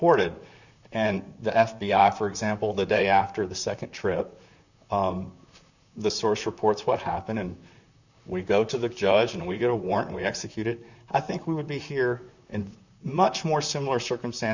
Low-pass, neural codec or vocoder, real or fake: 7.2 kHz; vocoder, 44.1 kHz, 128 mel bands, Pupu-Vocoder; fake